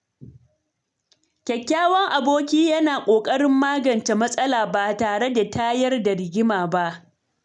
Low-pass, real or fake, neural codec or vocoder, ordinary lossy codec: 10.8 kHz; real; none; none